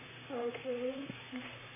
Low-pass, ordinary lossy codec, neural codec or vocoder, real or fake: 3.6 kHz; MP3, 16 kbps; vocoder, 44.1 kHz, 128 mel bands, Pupu-Vocoder; fake